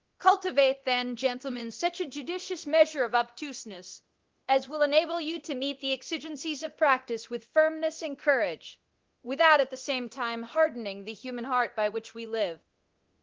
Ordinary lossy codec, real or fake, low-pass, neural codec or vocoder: Opus, 16 kbps; fake; 7.2 kHz; codec, 24 kHz, 0.9 kbps, DualCodec